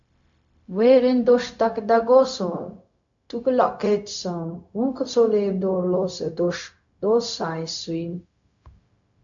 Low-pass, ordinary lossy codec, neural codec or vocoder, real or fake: 7.2 kHz; AAC, 64 kbps; codec, 16 kHz, 0.4 kbps, LongCat-Audio-Codec; fake